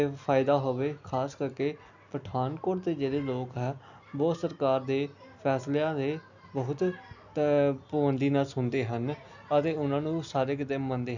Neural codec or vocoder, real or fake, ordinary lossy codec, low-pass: none; real; none; 7.2 kHz